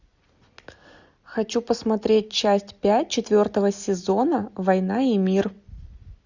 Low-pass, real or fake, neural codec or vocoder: 7.2 kHz; real; none